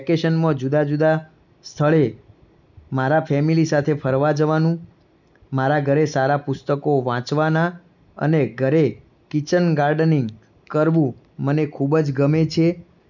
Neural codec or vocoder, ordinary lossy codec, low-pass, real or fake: none; none; 7.2 kHz; real